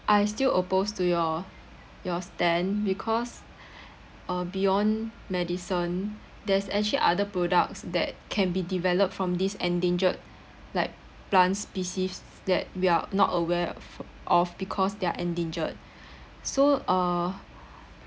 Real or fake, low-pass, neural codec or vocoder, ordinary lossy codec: real; none; none; none